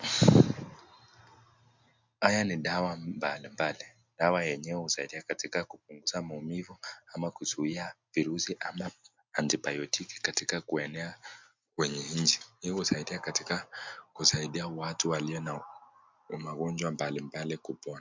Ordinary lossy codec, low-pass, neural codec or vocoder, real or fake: MP3, 64 kbps; 7.2 kHz; none; real